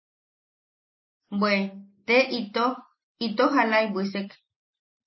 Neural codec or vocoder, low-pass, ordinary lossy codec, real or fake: none; 7.2 kHz; MP3, 24 kbps; real